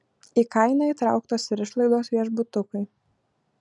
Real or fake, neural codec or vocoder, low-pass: real; none; 10.8 kHz